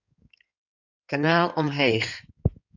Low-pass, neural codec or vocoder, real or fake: 7.2 kHz; codec, 16 kHz in and 24 kHz out, 2.2 kbps, FireRedTTS-2 codec; fake